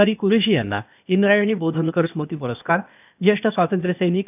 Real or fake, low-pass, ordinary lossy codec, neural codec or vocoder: fake; 3.6 kHz; none; codec, 16 kHz, 0.8 kbps, ZipCodec